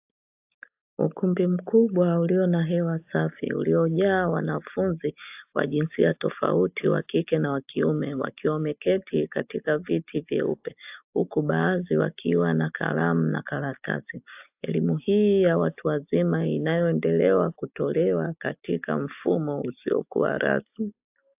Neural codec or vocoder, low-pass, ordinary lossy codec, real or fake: none; 3.6 kHz; AAC, 32 kbps; real